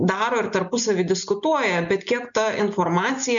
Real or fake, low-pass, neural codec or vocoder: real; 7.2 kHz; none